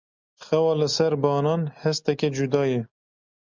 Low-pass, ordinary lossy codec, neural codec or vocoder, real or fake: 7.2 kHz; MP3, 64 kbps; none; real